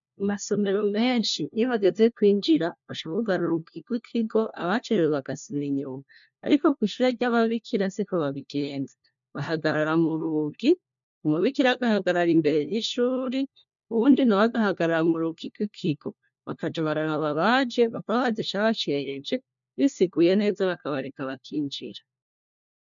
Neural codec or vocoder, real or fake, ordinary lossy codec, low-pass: codec, 16 kHz, 1 kbps, FunCodec, trained on LibriTTS, 50 frames a second; fake; MP3, 64 kbps; 7.2 kHz